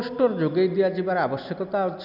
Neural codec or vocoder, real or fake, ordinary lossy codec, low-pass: none; real; none; 5.4 kHz